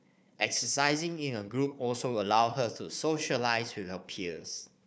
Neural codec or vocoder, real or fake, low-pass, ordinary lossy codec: codec, 16 kHz, 4 kbps, FunCodec, trained on Chinese and English, 50 frames a second; fake; none; none